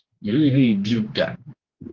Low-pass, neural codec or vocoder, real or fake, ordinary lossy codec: 7.2 kHz; codec, 16 kHz, 2 kbps, X-Codec, HuBERT features, trained on general audio; fake; Opus, 16 kbps